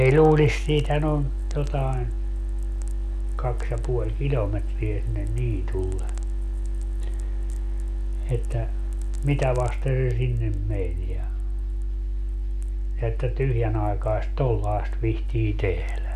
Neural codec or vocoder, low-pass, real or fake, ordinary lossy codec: none; 14.4 kHz; real; none